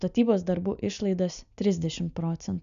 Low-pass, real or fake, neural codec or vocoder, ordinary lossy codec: 7.2 kHz; real; none; MP3, 96 kbps